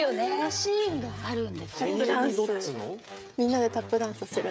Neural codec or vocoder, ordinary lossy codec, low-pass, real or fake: codec, 16 kHz, 16 kbps, FreqCodec, smaller model; none; none; fake